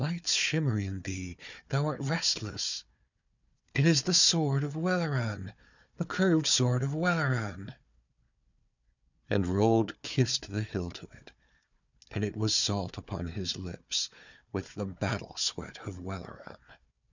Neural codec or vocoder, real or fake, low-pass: codec, 16 kHz, 4 kbps, FunCodec, trained on Chinese and English, 50 frames a second; fake; 7.2 kHz